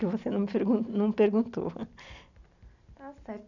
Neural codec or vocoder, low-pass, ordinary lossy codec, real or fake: none; 7.2 kHz; none; real